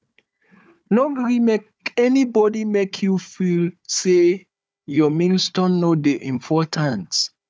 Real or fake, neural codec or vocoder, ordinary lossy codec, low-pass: fake; codec, 16 kHz, 4 kbps, FunCodec, trained on Chinese and English, 50 frames a second; none; none